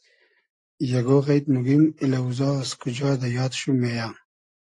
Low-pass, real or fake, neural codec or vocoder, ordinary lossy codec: 10.8 kHz; real; none; AAC, 48 kbps